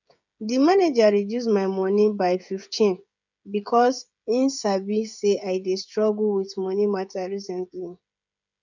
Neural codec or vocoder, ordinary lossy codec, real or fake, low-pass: codec, 16 kHz, 16 kbps, FreqCodec, smaller model; none; fake; 7.2 kHz